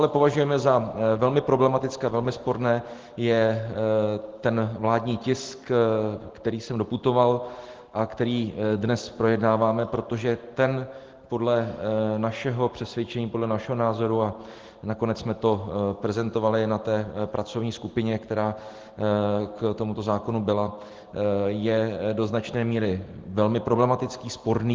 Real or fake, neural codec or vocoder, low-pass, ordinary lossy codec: real; none; 7.2 kHz; Opus, 16 kbps